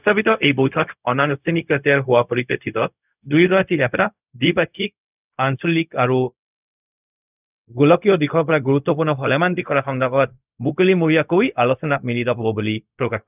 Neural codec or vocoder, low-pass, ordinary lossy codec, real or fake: codec, 16 kHz, 0.4 kbps, LongCat-Audio-Codec; 3.6 kHz; none; fake